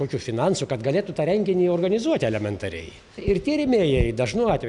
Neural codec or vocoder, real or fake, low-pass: none; real; 10.8 kHz